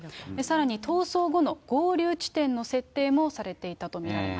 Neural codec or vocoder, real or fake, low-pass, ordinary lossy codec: none; real; none; none